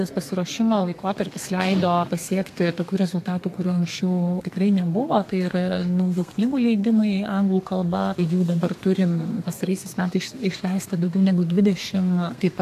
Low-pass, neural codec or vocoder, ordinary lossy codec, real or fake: 14.4 kHz; codec, 32 kHz, 1.9 kbps, SNAC; AAC, 64 kbps; fake